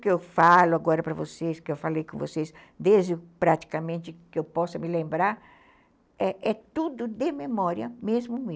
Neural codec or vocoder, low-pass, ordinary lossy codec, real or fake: none; none; none; real